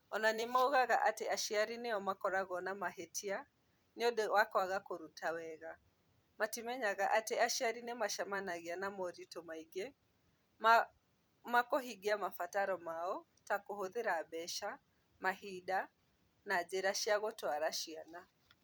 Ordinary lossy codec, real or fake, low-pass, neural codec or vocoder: none; fake; none; vocoder, 44.1 kHz, 128 mel bands every 256 samples, BigVGAN v2